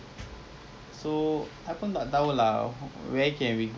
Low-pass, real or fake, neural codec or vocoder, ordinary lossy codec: none; real; none; none